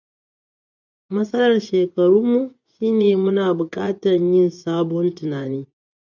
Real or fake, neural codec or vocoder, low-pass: fake; vocoder, 24 kHz, 100 mel bands, Vocos; 7.2 kHz